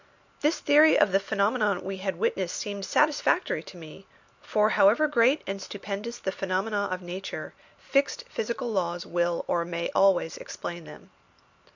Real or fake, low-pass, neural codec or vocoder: real; 7.2 kHz; none